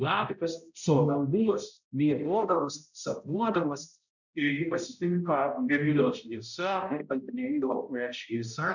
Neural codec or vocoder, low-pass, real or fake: codec, 16 kHz, 0.5 kbps, X-Codec, HuBERT features, trained on balanced general audio; 7.2 kHz; fake